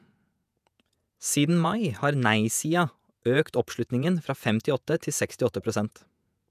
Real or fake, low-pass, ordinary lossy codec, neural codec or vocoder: fake; 14.4 kHz; none; vocoder, 48 kHz, 128 mel bands, Vocos